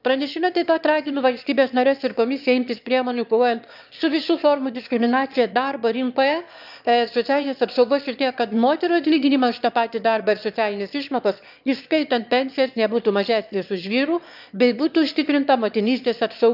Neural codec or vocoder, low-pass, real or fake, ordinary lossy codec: autoencoder, 22.05 kHz, a latent of 192 numbers a frame, VITS, trained on one speaker; 5.4 kHz; fake; none